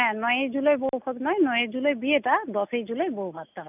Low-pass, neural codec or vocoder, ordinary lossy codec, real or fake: 3.6 kHz; none; none; real